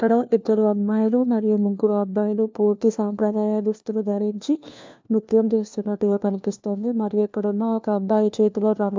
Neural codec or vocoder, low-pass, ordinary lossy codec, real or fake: codec, 16 kHz, 1 kbps, FunCodec, trained on LibriTTS, 50 frames a second; 7.2 kHz; MP3, 64 kbps; fake